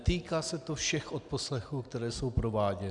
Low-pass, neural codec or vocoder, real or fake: 10.8 kHz; none; real